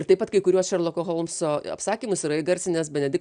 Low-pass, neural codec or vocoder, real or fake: 9.9 kHz; none; real